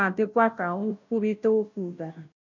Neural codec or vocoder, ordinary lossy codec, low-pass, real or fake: codec, 16 kHz, 0.5 kbps, FunCodec, trained on Chinese and English, 25 frames a second; none; 7.2 kHz; fake